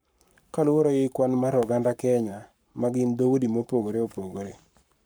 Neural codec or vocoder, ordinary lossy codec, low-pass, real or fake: codec, 44.1 kHz, 7.8 kbps, Pupu-Codec; none; none; fake